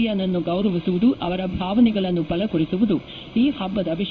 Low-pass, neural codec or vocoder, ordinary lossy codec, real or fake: 7.2 kHz; codec, 16 kHz in and 24 kHz out, 1 kbps, XY-Tokenizer; none; fake